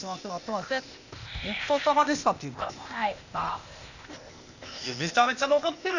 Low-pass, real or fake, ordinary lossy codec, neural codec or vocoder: 7.2 kHz; fake; none; codec, 16 kHz, 0.8 kbps, ZipCodec